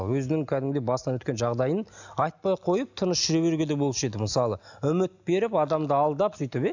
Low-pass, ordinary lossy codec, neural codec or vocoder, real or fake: 7.2 kHz; none; none; real